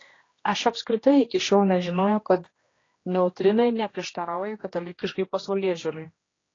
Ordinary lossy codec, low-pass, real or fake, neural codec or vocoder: AAC, 32 kbps; 7.2 kHz; fake; codec, 16 kHz, 1 kbps, X-Codec, HuBERT features, trained on general audio